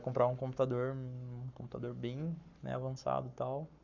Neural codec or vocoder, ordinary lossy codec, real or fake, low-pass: codec, 44.1 kHz, 7.8 kbps, Pupu-Codec; none; fake; 7.2 kHz